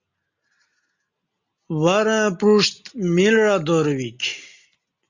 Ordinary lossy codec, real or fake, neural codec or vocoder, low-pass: Opus, 64 kbps; real; none; 7.2 kHz